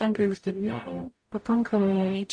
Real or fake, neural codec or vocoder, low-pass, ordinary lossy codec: fake; codec, 44.1 kHz, 0.9 kbps, DAC; 9.9 kHz; MP3, 48 kbps